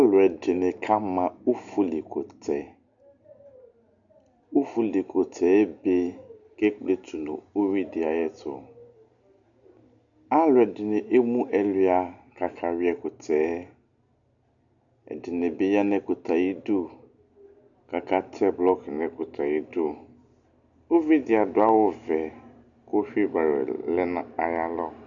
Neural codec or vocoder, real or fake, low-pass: none; real; 7.2 kHz